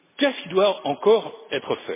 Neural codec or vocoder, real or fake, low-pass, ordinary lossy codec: none; real; 3.6 kHz; MP3, 16 kbps